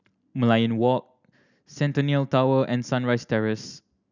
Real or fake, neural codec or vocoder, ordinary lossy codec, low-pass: real; none; none; 7.2 kHz